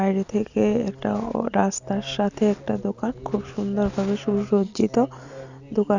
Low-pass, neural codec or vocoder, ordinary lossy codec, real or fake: 7.2 kHz; none; none; real